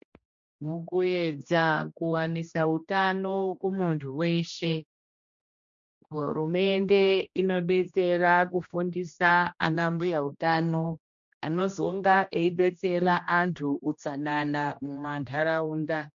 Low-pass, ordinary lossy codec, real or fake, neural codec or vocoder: 7.2 kHz; MP3, 48 kbps; fake; codec, 16 kHz, 1 kbps, X-Codec, HuBERT features, trained on general audio